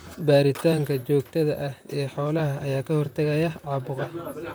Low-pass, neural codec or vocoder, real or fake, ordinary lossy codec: none; vocoder, 44.1 kHz, 128 mel bands, Pupu-Vocoder; fake; none